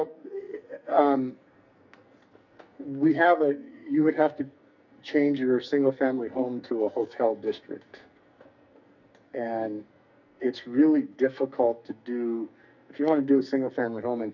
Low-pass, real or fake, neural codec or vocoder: 7.2 kHz; fake; codec, 44.1 kHz, 2.6 kbps, SNAC